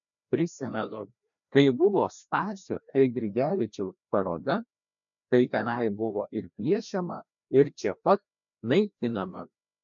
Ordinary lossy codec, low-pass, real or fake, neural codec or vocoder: MP3, 96 kbps; 7.2 kHz; fake; codec, 16 kHz, 1 kbps, FreqCodec, larger model